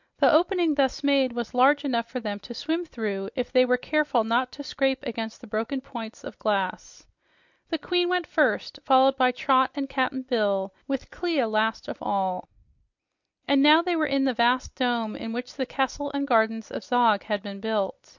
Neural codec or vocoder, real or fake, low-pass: none; real; 7.2 kHz